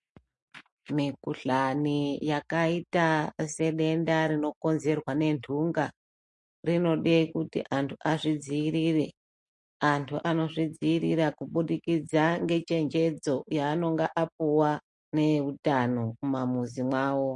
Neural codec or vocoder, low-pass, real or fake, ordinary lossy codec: none; 10.8 kHz; real; MP3, 48 kbps